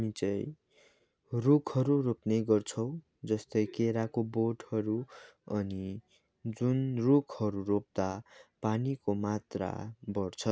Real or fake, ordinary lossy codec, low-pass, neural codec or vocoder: real; none; none; none